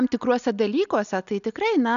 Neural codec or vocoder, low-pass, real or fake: none; 7.2 kHz; real